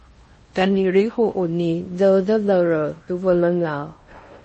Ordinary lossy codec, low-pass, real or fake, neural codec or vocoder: MP3, 32 kbps; 10.8 kHz; fake; codec, 16 kHz in and 24 kHz out, 0.6 kbps, FocalCodec, streaming, 4096 codes